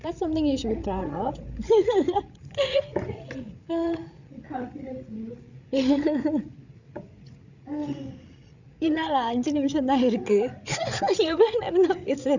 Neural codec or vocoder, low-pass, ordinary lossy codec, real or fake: codec, 16 kHz, 8 kbps, FreqCodec, larger model; 7.2 kHz; none; fake